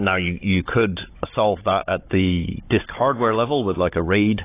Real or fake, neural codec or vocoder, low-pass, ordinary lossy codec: fake; codec, 16 kHz, 16 kbps, FunCodec, trained on Chinese and English, 50 frames a second; 3.6 kHz; AAC, 24 kbps